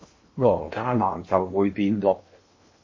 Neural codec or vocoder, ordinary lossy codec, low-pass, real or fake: codec, 16 kHz in and 24 kHz out, 0.8 kbps, FocalCodec, streaming, 65536 codes; MP3, 32 kbps; 7.2 kHz; fake